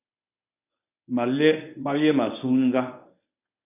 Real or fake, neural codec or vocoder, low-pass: fake; codec, 24 kHz, 0.9 kbps, WavTokenizer, medium speech release version 1; 3.6 kHz